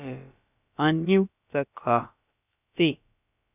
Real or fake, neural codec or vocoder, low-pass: fake; codec, 16 kHz, about 1 kbps, DyCAST, with the encoder's durations; 3.6 kHz